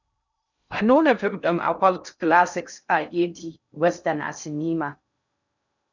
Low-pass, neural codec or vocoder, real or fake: 7.2 kHz; codec, 16 kHz in and 24 kHz out, 0.6 kbps, FocalCodec, streaming, 2048 codes; fake